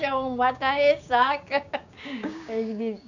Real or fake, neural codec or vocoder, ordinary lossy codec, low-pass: fake; codec, 16 kHz, 6 kbps, DAC; none; 7.2 kHz